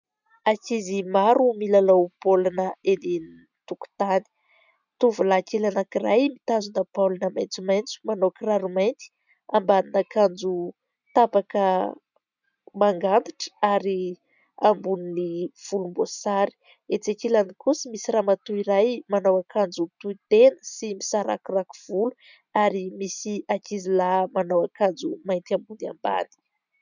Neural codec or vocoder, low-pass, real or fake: none; 7.2 kHz; real